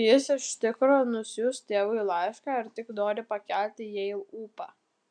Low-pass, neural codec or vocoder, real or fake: 9.9 kHz; none; real